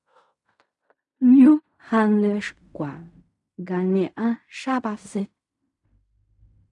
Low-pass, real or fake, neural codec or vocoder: 10.8 kHz; fake; codec, 16 kHz in and 24 kHz out, 0.4 kbps, LongCat-Audio-Codec, fine tuned four codebook decoder